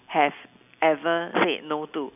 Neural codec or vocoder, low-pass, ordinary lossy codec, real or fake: none; 3.6 kHz; none; real